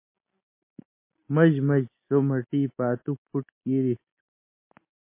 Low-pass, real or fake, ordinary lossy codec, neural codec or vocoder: 3.6 kHz; real; MP3, 24 kbps; none